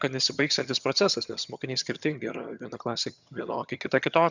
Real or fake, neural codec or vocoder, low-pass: fake; vocoder, 22.05 kHz, 80 mel bands, HiFi-GAN; 7.2 kHz